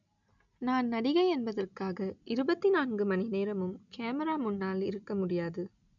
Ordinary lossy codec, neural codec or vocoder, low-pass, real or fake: MP3, 96 kbps; none; 7.2 kHz; real